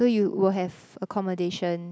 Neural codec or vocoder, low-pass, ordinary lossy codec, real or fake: none; none; none; real